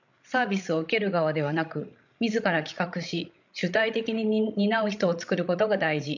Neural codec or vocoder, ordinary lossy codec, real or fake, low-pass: codec, 16 kHz, 16 kbps, FreqCodec, larger model; none; fake; 7.2 kHz